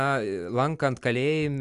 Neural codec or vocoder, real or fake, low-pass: none; real; 10.8 kHz